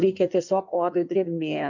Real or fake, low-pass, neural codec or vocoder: fake; 7.2 kHz; codec, 16 kHz in and 24 kHz out, 1.1 kbps, FireRedTTS-2 codec